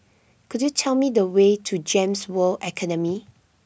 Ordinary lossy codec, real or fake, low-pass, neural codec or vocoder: none; real; none; none